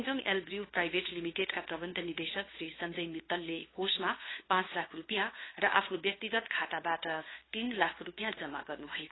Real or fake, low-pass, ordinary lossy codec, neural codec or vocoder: fake; 7.2 kHz; AAC, 16 kbps; codec, 16 kHz, 2 kbps, FunCodec, trained on LibriTTS, 25 frames a second